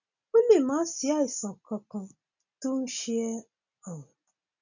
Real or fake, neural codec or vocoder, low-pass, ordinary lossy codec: real; none; 7.2 kHz; none